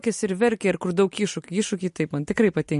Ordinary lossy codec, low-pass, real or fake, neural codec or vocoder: MP3, 48 kbps; 10.8 kHz; real; none